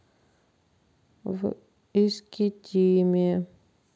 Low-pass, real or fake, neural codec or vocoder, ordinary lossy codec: none; real; none; none